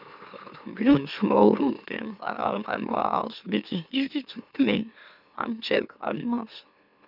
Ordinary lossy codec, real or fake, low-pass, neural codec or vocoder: none; fake; 5.4 kHz; autoencoder, 44.1 kHz, a latent of 192 numbers a frame, MeloTTS